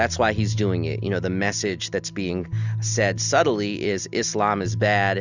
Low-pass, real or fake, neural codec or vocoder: 7.2 kHz; real; none